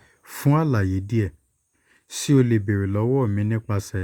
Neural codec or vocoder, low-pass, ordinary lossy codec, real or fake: none; 19.8 kHz; Opus, 64 kbps; real